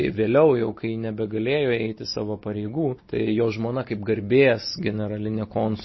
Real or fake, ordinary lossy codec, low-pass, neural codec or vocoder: real; MP3, 24 kbps; 7.2 kHz; none